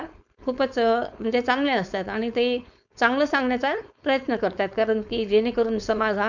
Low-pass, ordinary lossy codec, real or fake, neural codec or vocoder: 7.2 kHz; none; fake; codec, 16 kHz, 4.8 kbps, FACodec